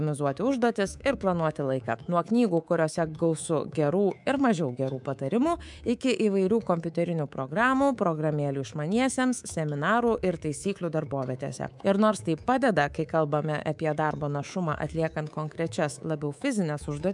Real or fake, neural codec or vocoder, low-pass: fake; codec, 24 kHz, 3.1 kbps, DualCodec; 10.8 kHz